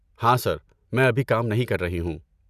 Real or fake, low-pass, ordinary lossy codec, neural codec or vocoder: real; 14.4 kHz; none; none